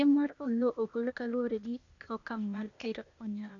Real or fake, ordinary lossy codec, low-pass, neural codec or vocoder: fake; none; 7.2 kHz; codec, 16 kHz, 0.8 kbps, ZipCodec